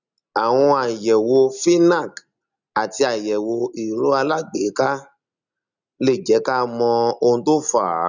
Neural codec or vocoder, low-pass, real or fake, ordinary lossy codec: none; 7.2 kHz; real; none